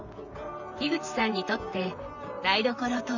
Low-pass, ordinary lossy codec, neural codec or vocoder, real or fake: 7.2 kHz; none; vocoder, 44.1 kHz, 128 mel bands, Pupu-Vocoder; fake